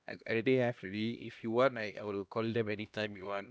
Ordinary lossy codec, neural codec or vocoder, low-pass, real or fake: none; codec, 16 kHz, 1 kbps, X-Codec, HuBERT features, trained on LibriSpeech; none; fake